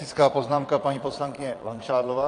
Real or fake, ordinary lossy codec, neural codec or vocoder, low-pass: fake; AAC, 48 kbps; vocoder, 22.05 kHz, 80 mel bands, Vocos; 9.9 kHz